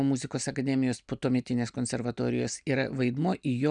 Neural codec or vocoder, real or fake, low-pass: none; real; 9.9 kHz